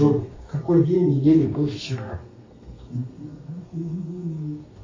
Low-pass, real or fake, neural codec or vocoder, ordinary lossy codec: 7.2 kHz; fake; codec, 32 kHz, 1.9 kbps, SNAC; MP3, 32 kbps